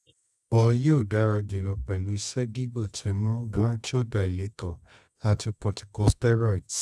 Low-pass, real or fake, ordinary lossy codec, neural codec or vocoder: none; fake; none; codec, 24 kHz, 0.9 kbps, WavTokenizer, medium music audio release